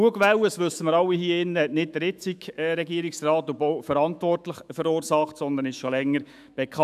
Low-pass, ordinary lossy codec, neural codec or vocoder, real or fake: 14.4 kHz; none; autoencoder, 48 kHz, 128 numbers a frame, DAC-VAE, trained on Japanese speech; fake